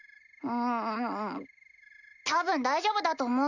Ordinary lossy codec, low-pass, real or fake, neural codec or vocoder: Opus, 64 kbps; 7.2 kHz; real; none